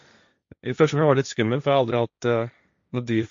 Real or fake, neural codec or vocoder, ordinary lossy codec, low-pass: fake; codec, 16 kHz, 1.1 kbps, Voila-Tokenizer; MP3, 48 kbps; 7.2 kHz